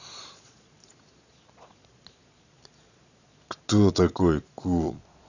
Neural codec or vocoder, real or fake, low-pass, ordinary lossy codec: vocoder, 22.05 kHz, 80 mel bands, Vocos; fake; 7.2 kHz; none